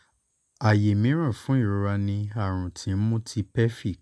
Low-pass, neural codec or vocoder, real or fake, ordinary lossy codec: none; none; real; none